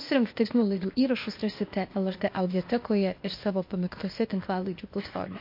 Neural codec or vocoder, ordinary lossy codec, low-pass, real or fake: codec, 16 kHz, 0.8 kbps, ZipCodec; MP3, 32 kbps; 5.4 kHz; fake